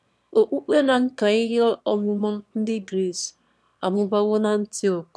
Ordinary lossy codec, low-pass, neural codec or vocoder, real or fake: none; none; autoencoder, 22.05 kHz, a latent of 192 numbers a frame, VITS, trained on one speaker; fake